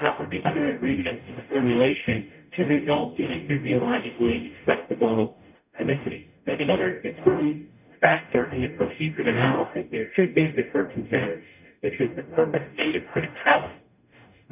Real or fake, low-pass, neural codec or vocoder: fake; 3.6 kHz; codec, 44.1 kHz, 0.9 kbps, DAC